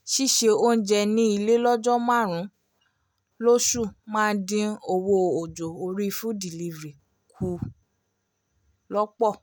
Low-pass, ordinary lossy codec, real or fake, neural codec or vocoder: none; none; real; none